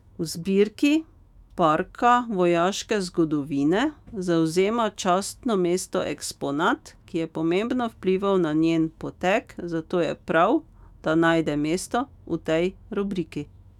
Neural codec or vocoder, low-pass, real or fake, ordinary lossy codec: autoencoder, 48 kHz, 128 numbers a frame, DAC-VAE, trained on Japanese speech; 19.8 kHz; fake; none